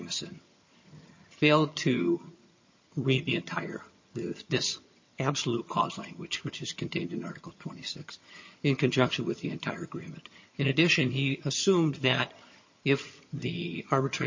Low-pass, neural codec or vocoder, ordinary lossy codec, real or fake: 7.2 kHz; vocoder, 22.05 kHz, 80 mel bands, HiFi-GAN; MP3, 32 kbps; fake